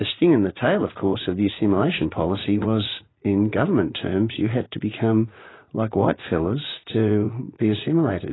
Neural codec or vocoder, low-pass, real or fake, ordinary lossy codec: vocoder, 44.1 kHz, 80 mel bands, Vocos; 7.2 kHz; fake; AAC, 16 kbps